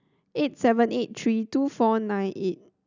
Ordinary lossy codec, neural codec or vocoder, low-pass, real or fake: none; none; 7.2 kHz; real